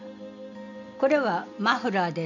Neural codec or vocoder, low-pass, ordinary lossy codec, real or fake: none; 7.2 kHz; none; real